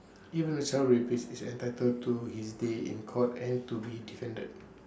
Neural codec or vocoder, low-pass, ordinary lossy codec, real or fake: none; none; none; real